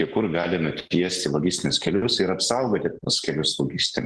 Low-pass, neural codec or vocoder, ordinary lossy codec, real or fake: 10.8 kHz; none; Opus, 16 kbps; real